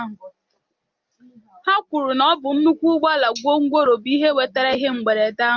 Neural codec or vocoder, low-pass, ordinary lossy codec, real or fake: none; 7.2 kHz; Opus, 24 kbps; real